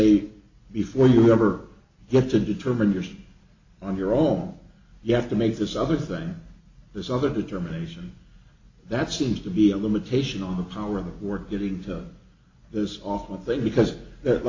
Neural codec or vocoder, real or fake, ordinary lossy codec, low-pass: none; real; AAC, 48 kbps; 7.2 kHz